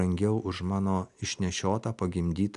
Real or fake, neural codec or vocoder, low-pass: real; none; 10.8 kHz